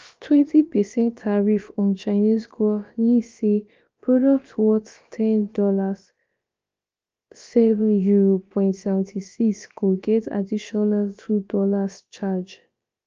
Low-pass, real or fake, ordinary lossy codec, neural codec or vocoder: 7.2 kHz; fake; Opus, 24 kbps; codec, 16 kHz, about 1 kbps, DyCAST, with the encoder's durations